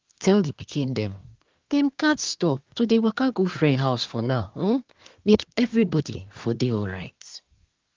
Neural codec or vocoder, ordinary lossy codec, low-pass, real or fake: codec, 24 kHz, 1 kbps, SNAC; Opus, 32 kbps; 7.2 kHz; fake